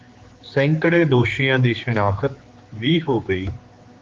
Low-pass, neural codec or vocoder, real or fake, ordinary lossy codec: 7.2 kHz; codec, 16 kHz, 4 kbps, X-Codec, HuBERT features, trained on general audio; fake; Opus, 32 kbps